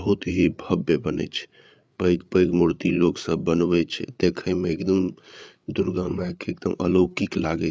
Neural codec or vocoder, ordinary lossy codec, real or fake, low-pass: codec, 16 kHz, 8 kbps, FreqCodec, larger model; none; fake; none